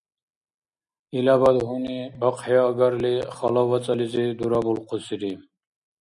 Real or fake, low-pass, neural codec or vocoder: real; 10.8 kHz; none